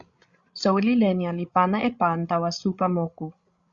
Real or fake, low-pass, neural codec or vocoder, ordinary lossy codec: fake; 7.2 kHz; codec, 16 kHz, 16 kbps, FreqCodec, larger model; Opus, 64 kbps